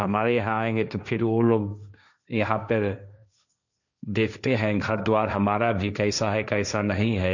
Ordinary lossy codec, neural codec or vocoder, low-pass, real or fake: none; codec, 16 kHz, 1.1 kbps, Voila-Tokenizer; 7.2 kHz; fake